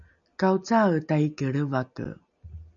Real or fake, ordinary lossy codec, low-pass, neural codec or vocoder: real; MP3, 96 kbps; 7.2 kHz; none